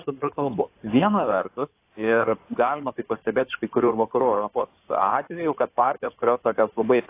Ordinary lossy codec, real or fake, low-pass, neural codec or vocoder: AAC, 24 kbps; fake; 3.6 kHz; codec, 16 kHz in and 24 kHz out, 2.2 kbps, FireRedTTS-2 codec